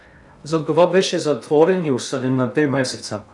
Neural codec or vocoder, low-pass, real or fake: codec, 16 kHz in and 24 kHz out, 0.6 kbps, FocalCodec, streaming, 2048 codes; 10.8 kHz; fake